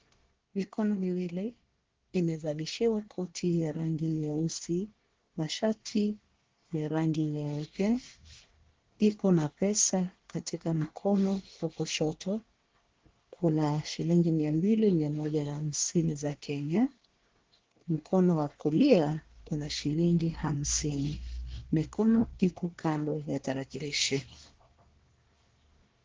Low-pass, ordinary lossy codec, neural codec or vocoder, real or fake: 7.2 kHz; Opus, 16 kbps; codec, 24 kHz, 1 kbps, SNAC; fake